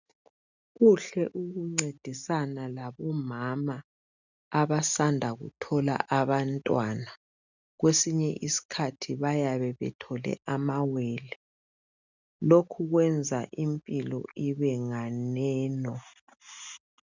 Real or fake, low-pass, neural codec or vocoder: real; 7.2 kHz; none